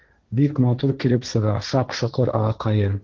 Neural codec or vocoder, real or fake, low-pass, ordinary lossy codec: codec, 16 kHz, 1.1 kbps, Voila-Tokenizer; fake; 7.2 kHz; Opus, 16 kbps